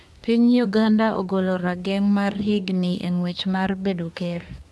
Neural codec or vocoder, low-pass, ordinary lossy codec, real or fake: codec, 24 kHz, 1 kbps, SNAC; none; none; fake